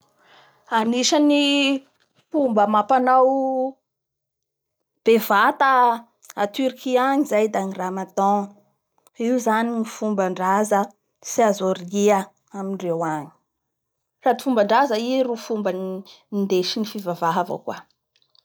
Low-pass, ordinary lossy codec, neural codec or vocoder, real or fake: none; none; none; real